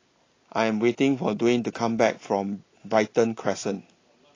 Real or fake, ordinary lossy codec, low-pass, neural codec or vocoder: real; AAC, 32 kbps; 7.2 kHz; none